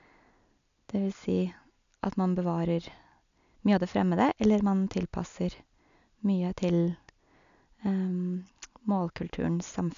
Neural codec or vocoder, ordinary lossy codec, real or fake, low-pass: none; none; real; 7.2 kHz